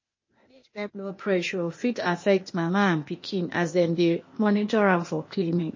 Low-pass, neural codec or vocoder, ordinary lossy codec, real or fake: 7.2 kHz; codec, 16 kHz, 0.8 kbps, ZipCodec; MP3, 32 kbps; fake